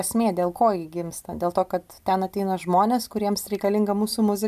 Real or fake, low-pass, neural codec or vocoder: real; 14.4 kHz; none